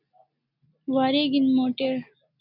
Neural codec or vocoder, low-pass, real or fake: none; 5.4 kHz; real